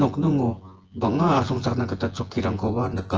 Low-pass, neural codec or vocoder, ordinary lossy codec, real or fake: 7.2 kHz; vocoder, 24 kHz, 100 mel bands, Vocos; Opus, 16 kbps; fake